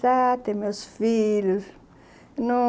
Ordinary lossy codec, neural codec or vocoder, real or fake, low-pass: none; none; real; none